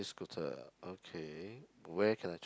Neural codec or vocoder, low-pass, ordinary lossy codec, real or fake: none; none; none; real